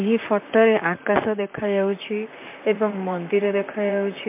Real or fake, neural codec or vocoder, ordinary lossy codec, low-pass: fake; vocoder, 44.1 kHz, 128 mel bands, Pupu-Vocoder; MP3, 32 kbps; 3.6 kHz